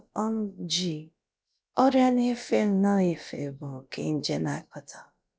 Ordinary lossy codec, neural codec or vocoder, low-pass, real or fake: none; codec, 16 kHz, about 1 kbps, DyCAST, with the encoder's durations; none; fake